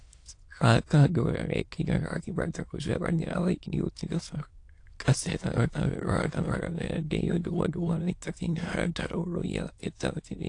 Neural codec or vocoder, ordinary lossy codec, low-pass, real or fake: autoencoder, 22.05 kHz, a latent of 192 numbers a frame, VITS, trained on many speakers; AAC, 48 kbps; 9.9 kHz; fake